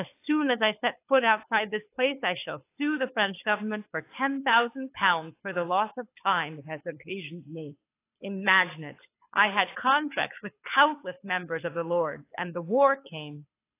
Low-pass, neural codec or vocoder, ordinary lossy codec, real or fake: 3.6 kHz; codec, 24 kHz, 6 kbps, HILCodec; AAC, 24 kbps; fake